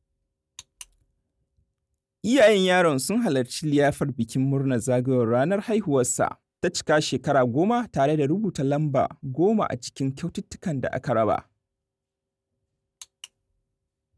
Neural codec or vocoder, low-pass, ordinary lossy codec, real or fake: none; none; none; real